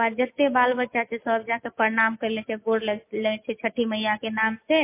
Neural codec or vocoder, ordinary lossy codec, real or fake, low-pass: none; MP3, 24 kbps; real; 3.6 kHz